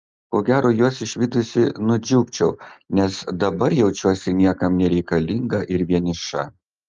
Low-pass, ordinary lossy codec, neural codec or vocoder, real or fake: 7.2 kHz; Opus, 32 kbps; none; real